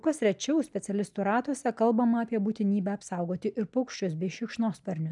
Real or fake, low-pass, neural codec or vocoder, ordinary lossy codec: real; 10.8 kHz; none; MP3, 96 kbps